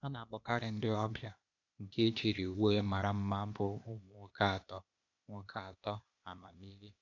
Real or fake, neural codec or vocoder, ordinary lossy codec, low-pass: fake; codec, 16 kHz, 0.8 kbps, ZipCodec; none; 7.2 kHz